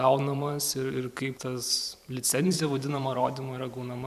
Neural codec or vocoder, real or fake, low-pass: vocoder, 44.1 kHz, 128 mel bands every 512 samples, BigVGAN v2; fake; 14.4 kHz